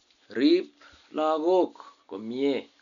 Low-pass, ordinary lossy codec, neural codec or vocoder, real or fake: 7.2 kHz; none; none; real